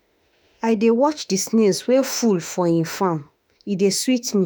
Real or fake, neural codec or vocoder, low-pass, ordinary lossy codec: fake; autoencoder, 48 kHz, 32 numbers a frame, DAC-VAE, trained on Japanese speech; none; none